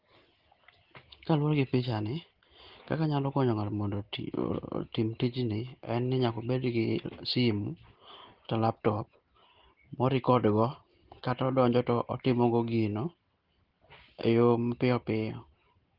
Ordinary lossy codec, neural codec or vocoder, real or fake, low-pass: Opus, 16 kbps; none; real; 5.4 kHz